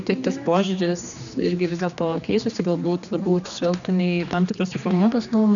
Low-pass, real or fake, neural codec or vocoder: 7.2 kHz; fake; codec, 16 kHz, 2 kbps, X-Codec, HuBERT features, trained on general audio